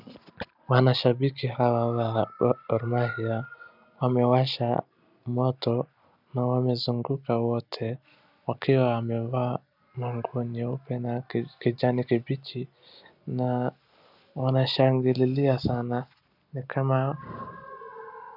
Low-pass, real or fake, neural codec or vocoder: 5.4 kHz; real; none